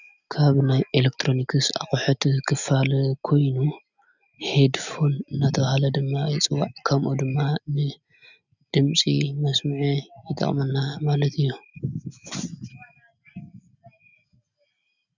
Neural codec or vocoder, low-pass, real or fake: none; 7.2 kHz; real